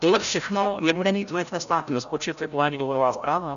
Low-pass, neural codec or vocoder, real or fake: 7.2 kHz; codec, 16 kHz, 0.5 kbps, FreqCodec, larger model; fake